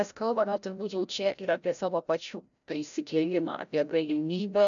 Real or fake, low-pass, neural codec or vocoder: fake; 7.2 kHz; codec, 16 kHz, 0.5 kbps, FreqCodec, larger model